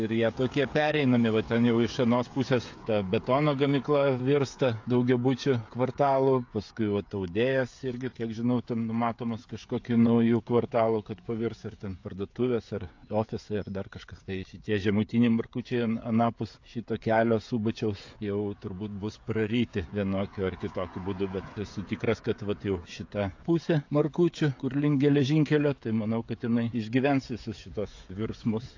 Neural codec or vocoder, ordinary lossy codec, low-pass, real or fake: codec, 16 kHz, 16 kbps, FreqCodec, smaller model; AAC, 48 kbps; 7.2 kHz; fake